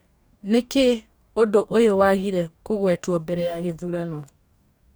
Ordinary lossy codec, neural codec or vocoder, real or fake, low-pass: none; codec, 44.1 kHz, 2.6 kbps, DAC; fake; none